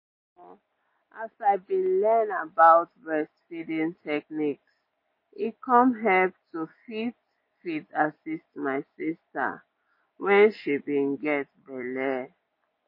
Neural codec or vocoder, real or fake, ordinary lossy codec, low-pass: none; real; MP3, 24 kbps; 5.4 kHz